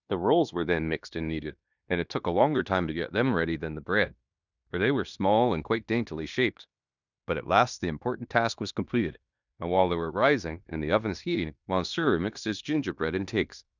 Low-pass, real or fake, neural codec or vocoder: 7.2 kHz; fake; codec, 16 kHz in and 24 kHz out, 0.9 kbps, LongCat-Audio-Codec, fine tuned four codebook decoder